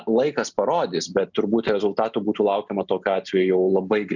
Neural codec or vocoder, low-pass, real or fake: none; 7.2 kHz; real